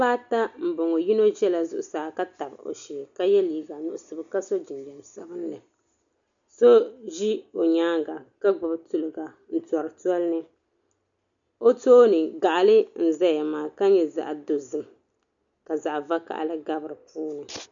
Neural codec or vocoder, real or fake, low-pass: none; real; 7.2 kHz